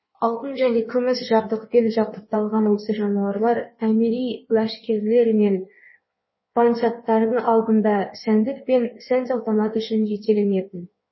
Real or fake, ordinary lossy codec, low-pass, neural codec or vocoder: fake; MP3, 24 kbps; 7.2 kHz; codec, 16 kHz in and 24 kHz out, 1.1 kbps, FireRedTTS-2 codec